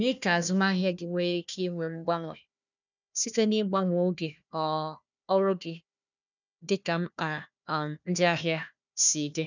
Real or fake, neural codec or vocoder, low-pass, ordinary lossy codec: fake; codec, 16 kHz, 1 kbps, FunCodec, trained on Chinese and English, 50 frames a second; 7.2 kHz; none